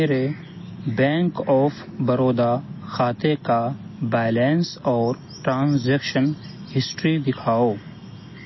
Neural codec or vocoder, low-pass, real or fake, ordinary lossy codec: none; 7.2 kHz; real; MP3, 24 kbps